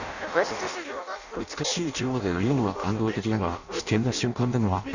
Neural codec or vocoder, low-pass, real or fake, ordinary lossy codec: codec, 16 kHz in and 24 kHz out, 0.6 kbps, FireRedTTS-2 codec; 7.2 kHz; fake; none